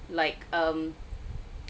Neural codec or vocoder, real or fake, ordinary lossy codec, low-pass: none; real; none; none